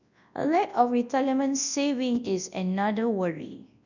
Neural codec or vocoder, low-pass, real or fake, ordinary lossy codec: codec, 24 kHz, 0.9 kbps, WavTokenizer, large speech release; 7.2 kHz; fake; none